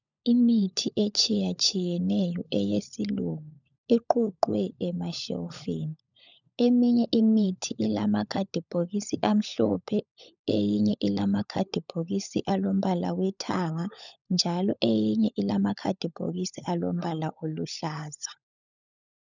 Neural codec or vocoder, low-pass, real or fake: codec, 16 kHz, 16 kbps, FunCodec, trained on LibriTTS, 50 frames a second; 7.2 kHz; fake